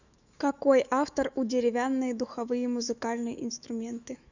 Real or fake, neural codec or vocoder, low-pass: fake; autoencoder, 48 kHz, 128 numbers a frame, DAC-VAE, trained on Japanese speech; 7.2 kHz